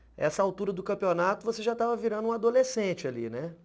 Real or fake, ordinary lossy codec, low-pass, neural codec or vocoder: real; none; none; none